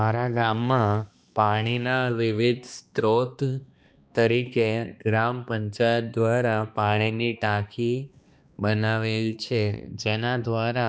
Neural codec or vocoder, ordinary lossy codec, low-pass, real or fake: codec, 16 kHz, 2 kbps, X-Codec, HuBERT features, trained on balanced general audio; none; none; fake